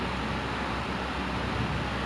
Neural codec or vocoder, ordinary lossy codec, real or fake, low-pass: none; none; real; none